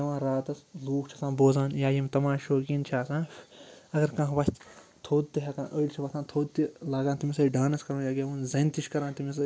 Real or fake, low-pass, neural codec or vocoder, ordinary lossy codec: real; none; none; none